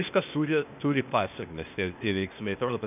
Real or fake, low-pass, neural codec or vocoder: fake; 3.6 kHz; codec, 16 kHz, 0.8 kbps, ZipCodec